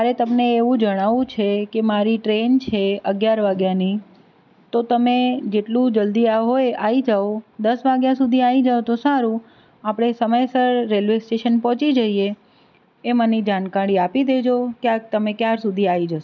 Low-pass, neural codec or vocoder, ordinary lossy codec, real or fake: 7.2 kHz; none; none; real